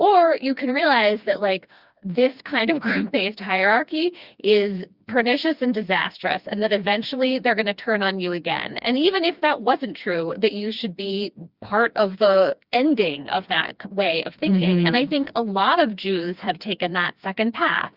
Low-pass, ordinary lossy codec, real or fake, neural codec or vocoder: 5.4 kHz; Opus, 64 kbps; fake; codec, 16 kHz, 2 kbps, FreqCodec, smaller model